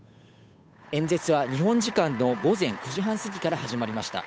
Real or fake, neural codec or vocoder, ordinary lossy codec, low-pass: fake; codec, 16 kHz, 8 kbps, FunCodec, trained on Chinese and English, 25 frames a second; none; none